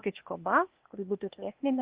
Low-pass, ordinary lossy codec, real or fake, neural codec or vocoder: 3.6 kHz; Opus, 16 kbps; fake; codec, 16 kHz, 0.8 kbps, ZipCodec